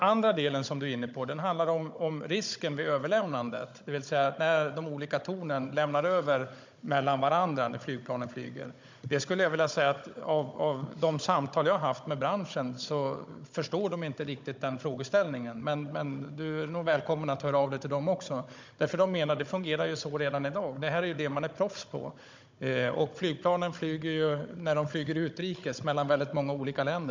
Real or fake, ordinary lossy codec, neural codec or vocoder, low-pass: fake; MP3, 64 kbps; codec, 16 kHz, 16 kbps, FunCodec, trained on Chinese and English, 50 frames a second; 7.2 kHz